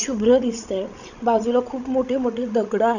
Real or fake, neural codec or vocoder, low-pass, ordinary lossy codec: fake; codec, 16 kHz, 16 kbps, FreqCodec, larger model; 7.2 kHz; none